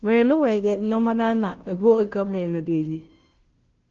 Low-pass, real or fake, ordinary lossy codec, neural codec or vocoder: 7.2 kHz; fake; Opus, 16 kbps; codec, 16 kHz, 0.5 kbps, FunCodec, trained on Chinese and English, 25 frames a second